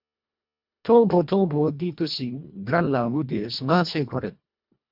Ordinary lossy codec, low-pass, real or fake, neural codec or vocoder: MP3, 48 kbps; 5.4 kHz; fake; codec, 24 kHz, 1.5 kbps, HILCodec